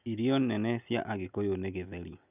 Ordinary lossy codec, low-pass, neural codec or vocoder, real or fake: none; 3.6 kHz; none; real